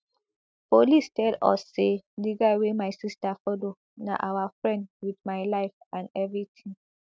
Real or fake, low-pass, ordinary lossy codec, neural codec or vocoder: real; none; none; none